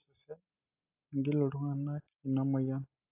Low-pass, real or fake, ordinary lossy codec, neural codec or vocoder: 3.6 kHz; real; AAC, 32 kbps; none